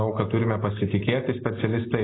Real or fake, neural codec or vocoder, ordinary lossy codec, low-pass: real; none; AAC, 16 kbps; 7.2 kHz